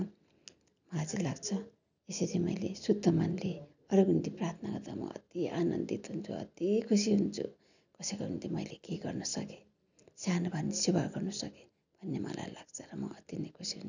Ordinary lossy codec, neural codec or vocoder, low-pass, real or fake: none; none; 7.2 kHz; real